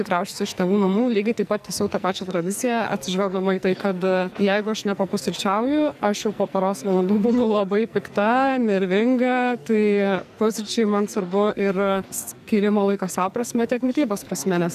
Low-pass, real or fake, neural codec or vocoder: 14.4 kHz; fake; codec, 44.1 kHz, 2.6 kbps, SNAC